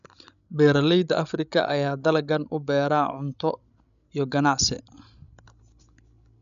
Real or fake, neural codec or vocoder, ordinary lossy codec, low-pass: fake; codec, 16 kHz, 16 kbps, FreqCodec, larger model; none; 7.2 kHz